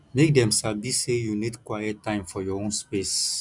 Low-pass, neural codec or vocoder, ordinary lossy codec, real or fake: 10.8 kHz; none; none; real